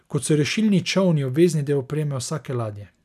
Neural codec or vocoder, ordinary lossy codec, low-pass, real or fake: none; none; 14.4 kHz; real